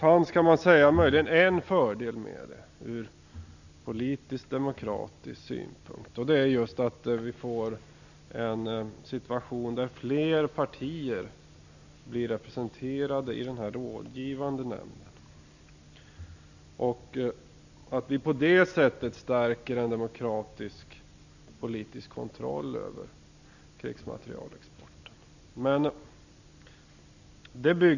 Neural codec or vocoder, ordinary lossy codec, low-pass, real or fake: none; none; 7.2 kHz; real